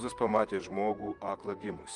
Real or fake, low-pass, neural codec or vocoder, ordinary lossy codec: fake; 10.8 kHz; vocoder, 44.1 kHz, 128 mel bands, Pupu-Vocoder; Opus, 32 kbps